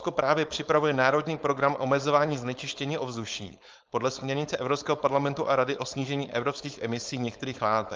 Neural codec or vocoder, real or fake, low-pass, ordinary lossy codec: codec, 16 kHz, 4.8 kbps, FACodec; fake; 7.2 kHz; Opus, 24 kbps